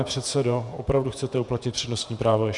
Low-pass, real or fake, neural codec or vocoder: 10.8 kHz; fake; vocoder, 48 kHz, 128 mel bands, Vocos